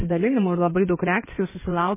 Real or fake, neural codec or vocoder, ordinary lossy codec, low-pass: fake; codec, 16 kHz in and 24 kHz out, 2.2 kbps, FireRedTTS-2 codec; MP3, 16 kbps; 3.6 kHz